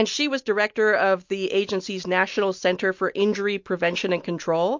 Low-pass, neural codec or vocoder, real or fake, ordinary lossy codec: 7.2 kHz; none; real; MP3, 48 kbps